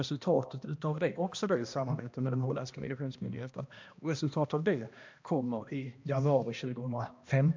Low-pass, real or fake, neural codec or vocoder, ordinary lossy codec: 7.2 kHz; fake; codec, 16 kHz, 1 kbps, X-Codec, HuBERT features, trained on balanced general audio; MP3, 64 kbps